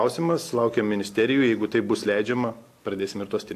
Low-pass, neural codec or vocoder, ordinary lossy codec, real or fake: 14.4 kHz; none; AAC, 64 kbps; real